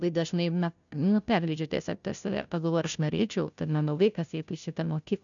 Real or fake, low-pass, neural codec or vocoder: fake; 7.2 kHz; codec, 16 kHz, 0.5 kbps, FunCodec, trained on Chinese and English, 25 frames a second